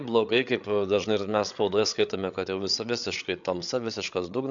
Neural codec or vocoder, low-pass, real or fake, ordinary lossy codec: codec, 16 kHz, 8 kbps, FreqCodec, larger model; 7.2 kHz; fake; AAC, 64 kbps